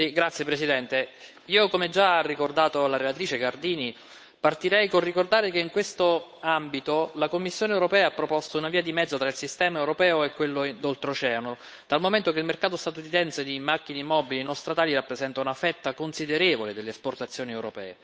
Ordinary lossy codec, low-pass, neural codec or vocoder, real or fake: none; none; codec, 16 kHz, 8 kbps, FunCodec, trained on Chinese and English, 25 frames a second; fake